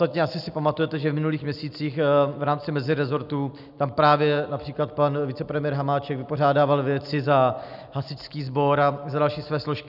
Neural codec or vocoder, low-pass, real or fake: none; 5.4 kHz; real